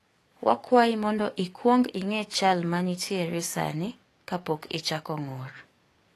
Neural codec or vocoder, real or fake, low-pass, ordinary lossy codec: codec, 44.1 kHz, 7.8 kbps, DAC; fake; 14.4 kHz; AAC, 48 kbps